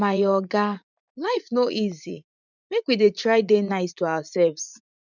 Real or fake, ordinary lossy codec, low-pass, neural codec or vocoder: fake; none; 7.2 kHz; vocoder, 44.1 kHz, 80 mel bands, Vocos